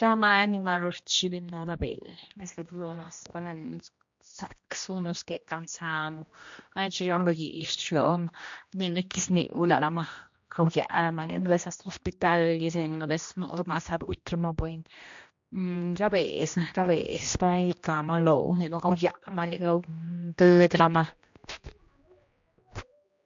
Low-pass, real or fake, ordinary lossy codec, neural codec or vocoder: 7.2 kHz; fake; MP3, 48 kbps; codec, 16 kHz, 1 kbps, X-Codec, HuBERT features, trained on general audio